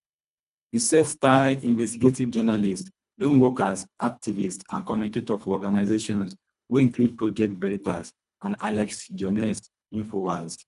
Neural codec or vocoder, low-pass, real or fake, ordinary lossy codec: codec, 24 kHz, 1.5 kbps, HILCodec; 10.8 kHz; fake; none